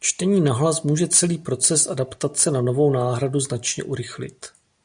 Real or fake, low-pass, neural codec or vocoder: real; 9.9 kHz; none